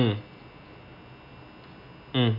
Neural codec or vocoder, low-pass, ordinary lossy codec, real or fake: none; 5.4 kHz; none; real